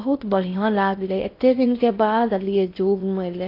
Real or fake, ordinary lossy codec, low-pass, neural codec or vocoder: fake; AAC, 32 kbps; 5.4 kHz; codec, 16 kHz in and 24 kHz out, 0.6 kbps, FocalCodec, streaming, 4096 codes